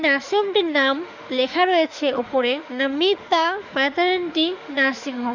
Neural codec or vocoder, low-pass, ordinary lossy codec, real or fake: autoencoder, 48 kHz, 32 numbers a frame, DAC-VAE, trained on Japanese speech; 7.2 kHz; none; fake